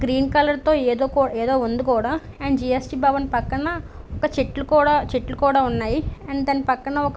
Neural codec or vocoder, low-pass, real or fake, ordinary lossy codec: none; none; real; none